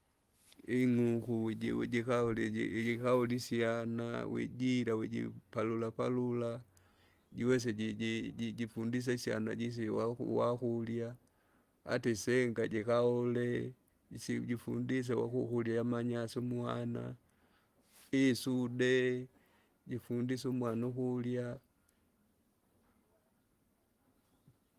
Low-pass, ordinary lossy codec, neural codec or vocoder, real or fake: 14.4 kHz; Opus, 24 kbps; none; real